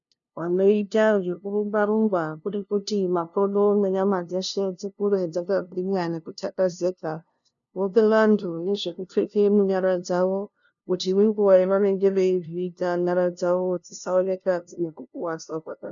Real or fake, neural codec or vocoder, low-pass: fake; codec, 16 kHz, 0.5 kbps, FunCodec, trained on LibriTTS, 25 frames a second; 7.2 kHz